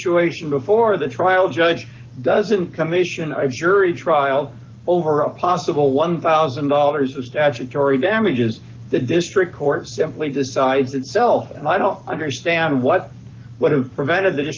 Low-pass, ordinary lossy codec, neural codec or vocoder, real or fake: 7.2 kHz; Opus, 32 kbps; codec, 44.1 kHz, 7.8 kbps, Pupu-Codec; fake